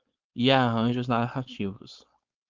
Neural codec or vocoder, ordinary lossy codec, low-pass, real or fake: codec, 16 kHz, 4.8 kbps, FACodec; Opus, 24 kbps; 7.2 kHz; fake